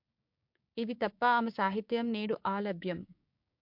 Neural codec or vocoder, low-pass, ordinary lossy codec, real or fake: codec, 16 kHz, 6 kbps, DAC; 5.4 kHz; MP3, 48 kbps; fake